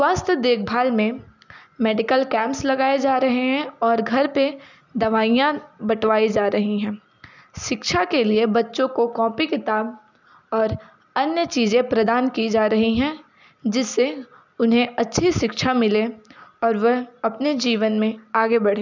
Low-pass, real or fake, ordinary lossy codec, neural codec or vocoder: 7.2 kHz; real; none; none